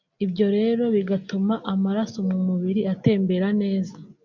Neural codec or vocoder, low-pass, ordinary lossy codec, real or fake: none; 7.2 kHz; MP3, 64 kbps; real